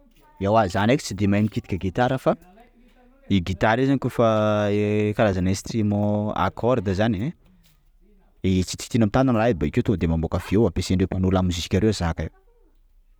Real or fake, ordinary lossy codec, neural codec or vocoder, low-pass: real; none; none; none